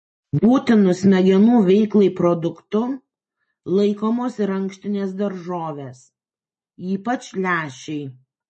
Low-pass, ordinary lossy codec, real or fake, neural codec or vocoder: 10.8 kHz; MP3, 32 kbps; real; none